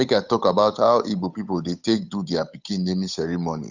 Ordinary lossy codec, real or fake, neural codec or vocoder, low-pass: none; fake; codec, 16 kHz, 8 kbps, FunCodec, trained on Chinese and English, 25 frames a second; 7.2 kHz